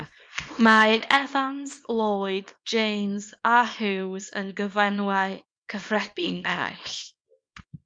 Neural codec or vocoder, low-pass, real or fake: codec, 24 kHz, 0.9 kbps, WavTokenizer, small release; 9.9 kHz; fake